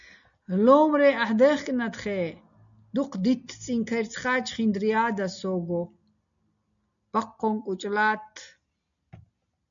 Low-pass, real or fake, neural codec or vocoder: 7.2 kHz; real; none